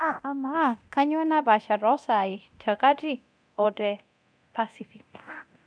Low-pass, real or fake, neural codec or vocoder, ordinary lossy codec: 9.9 kHz; fake; codec, 24 kHz, 0.9 kbps, DualCodec; none